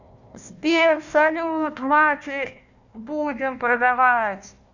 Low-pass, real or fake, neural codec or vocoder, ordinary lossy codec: 7.2 kHz; fake; codec, 16 kHz, 1 kbps, FunCodec, trained on Chinese and English, 50 frames a second; none